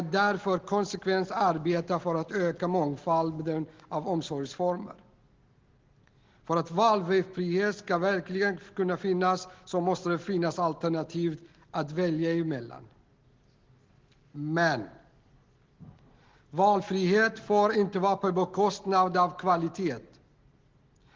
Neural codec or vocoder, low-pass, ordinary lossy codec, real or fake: none; 7.2 kHz; Opus, 16 kbps; real